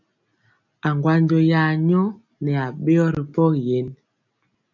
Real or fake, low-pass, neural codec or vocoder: real; 7.2 kHz; none